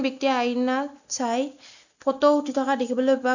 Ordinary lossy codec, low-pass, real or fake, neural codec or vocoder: none; 7.2 kHz; real; none